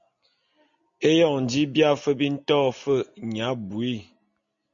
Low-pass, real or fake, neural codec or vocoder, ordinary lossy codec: 7.2 kHz; real; none; MP3, 48 kbps